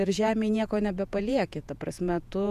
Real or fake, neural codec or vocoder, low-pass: fake; vocoder, 48 kHz, 128 mel bands, Vocos; 14.4 kHz